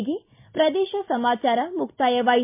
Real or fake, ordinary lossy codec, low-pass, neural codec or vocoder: real; MP3, 32 kbps; 3.6 kHz; none